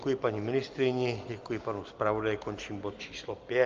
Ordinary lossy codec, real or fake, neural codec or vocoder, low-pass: Opus, 24 kbps; real; none; 7.2 kHz